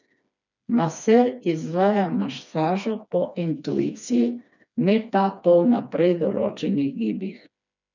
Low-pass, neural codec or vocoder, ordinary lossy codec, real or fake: 7.2 kHz; codec, 16 kHz, 2 kbps, FreqCodec, smaller model; none; fake